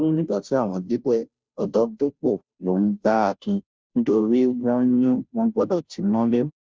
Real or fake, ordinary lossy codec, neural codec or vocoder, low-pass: fake; none; codec, 16 kHz, 0.5 kbps, FunCodec, trained on Chinese and English, 25 frames a second; none